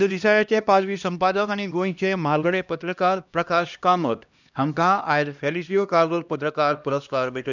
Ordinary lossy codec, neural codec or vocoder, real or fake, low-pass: none; codec, 16 kHz, 1 kbps, X-Codec, HuBERT features, trained on LibriSpeech; fake; 7.2 kHz